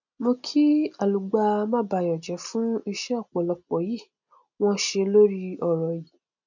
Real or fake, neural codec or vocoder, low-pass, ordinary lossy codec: real; none; 7.2 kHz; none